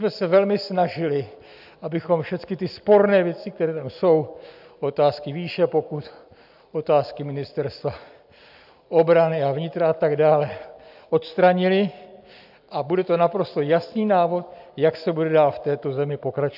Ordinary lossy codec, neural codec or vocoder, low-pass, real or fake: AAC, 48 kbps; none; 5.4 kHz; real